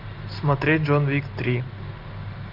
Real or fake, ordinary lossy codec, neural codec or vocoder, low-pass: real; Opus, 32 kbps; none; 5.4 kHz